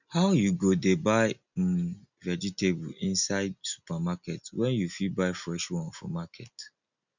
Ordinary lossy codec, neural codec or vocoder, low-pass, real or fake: none; none; 7.2 kHz; real